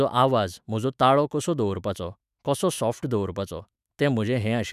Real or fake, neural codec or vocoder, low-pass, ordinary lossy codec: fake; autoencoder, 48 kHz, 128 numbers a frame, DAC-VAE, trained on Japanese speech; 14.4 kHz; none